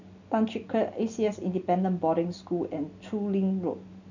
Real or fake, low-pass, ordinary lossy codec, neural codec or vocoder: real; 7.2 kHz; none; none